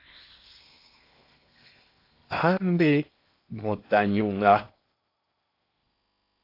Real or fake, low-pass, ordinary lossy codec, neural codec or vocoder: fake; 5.4 kHz; AAC, 32 kbps; codec, 16 kHz in and 24 kHz out, 0.8 kbps, FocalCodec, streaming, 65536 codes